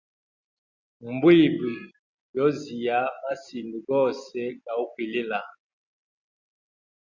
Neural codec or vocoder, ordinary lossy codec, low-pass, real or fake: none; Opus, 64 kbps; 7.2 kHz; real